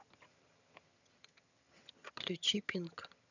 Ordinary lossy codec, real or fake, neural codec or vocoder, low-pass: none; fake; vocoder, 22.05 kHz, 80 mel bands, HiFi-GAN; 7.2 kHz